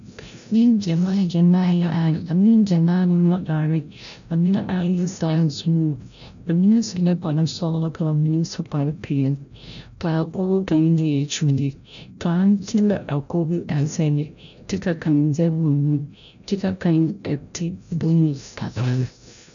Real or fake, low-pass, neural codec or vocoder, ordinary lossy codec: fake; 7.2 kHz; codec, 16 kHz, 0.5 kbps, FreqCodec, larger model; MP3, 96 kbps